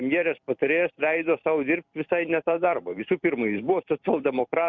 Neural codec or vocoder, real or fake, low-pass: vocoder, 44.1 kHz, 128 mel bands every 256 samples, BigVGAN v2; fake; 7.2 kHz